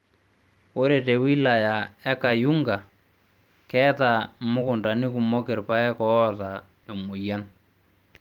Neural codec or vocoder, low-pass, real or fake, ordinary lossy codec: vocoder, 44.1 kHz, 128 mel bands every 512 samples, BigVGAN v2; 19.8 kHz; fake; Opus, 32 kbps